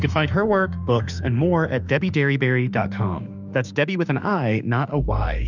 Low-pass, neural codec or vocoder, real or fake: 7.2 kHz; autoencoder, 48 kHz, 32 numbers a frame, DAC-VAE, trained on Japanese speech; fake